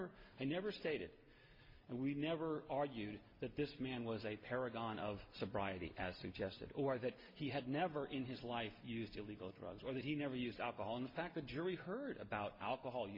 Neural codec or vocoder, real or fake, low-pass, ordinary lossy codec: none; real; 5.4 kHz; MP3, 32 kbps